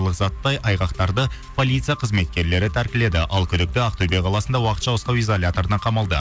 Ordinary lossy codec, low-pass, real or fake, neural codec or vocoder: none; none; real; none